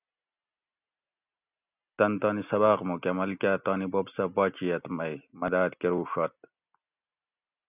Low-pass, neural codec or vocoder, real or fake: 3.6 kHz; none; real